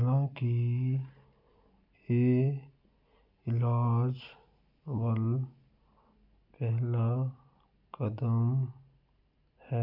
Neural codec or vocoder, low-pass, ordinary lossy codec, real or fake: none; 5.4 kHz; none; real